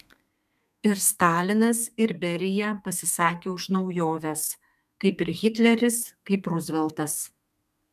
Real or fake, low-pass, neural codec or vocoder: fake; 14.4 kHz; codec, 32 kHz, 1.9 kbps, SNAC